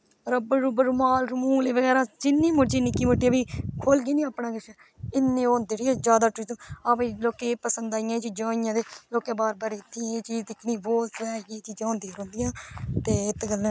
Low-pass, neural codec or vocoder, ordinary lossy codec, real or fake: none; none; none; real